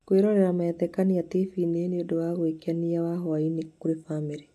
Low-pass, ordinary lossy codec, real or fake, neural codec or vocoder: 14.4 kHz; AAC, 64 kbps; real; none